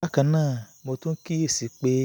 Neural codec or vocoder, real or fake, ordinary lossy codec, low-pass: none; real; none; none